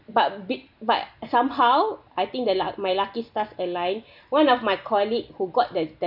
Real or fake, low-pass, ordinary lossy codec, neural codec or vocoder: real; 5.4 kHz; none; none